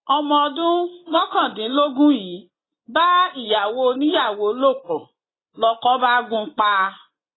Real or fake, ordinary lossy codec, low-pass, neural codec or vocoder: real; AAC, 16 kbps; 7.2 kHz; none